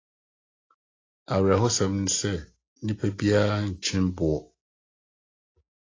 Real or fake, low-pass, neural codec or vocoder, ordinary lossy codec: real; 7.2 kHz; none; AAC, 32 kbps